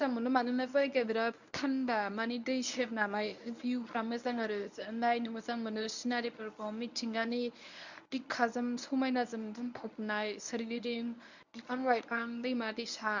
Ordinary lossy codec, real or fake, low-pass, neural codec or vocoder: none; fake; 7.2 kHz; codec, 24 kHz, 0.9 kbps, WavTokenizer, medium speech release version 1